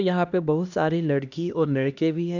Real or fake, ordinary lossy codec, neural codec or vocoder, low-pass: fake; none; codec, 16 kHz, 1 kbps, X-Codec, HuBERT features, trained on LibriSpeech; 7.2 kHz